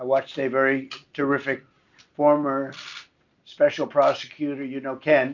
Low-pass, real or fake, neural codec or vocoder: 7.2 kHz; real; none